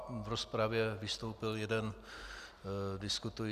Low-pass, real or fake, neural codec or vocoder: 14.4 kHz; real; none